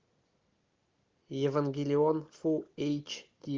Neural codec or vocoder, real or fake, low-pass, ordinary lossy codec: none; real; 7.2 kHz; Opus, 32 kbps